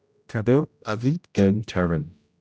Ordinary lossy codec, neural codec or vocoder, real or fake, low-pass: none; codec, 16 kHz, 0.5 kbps, X-Codec, HuBERT features, trained on general audio; fake; none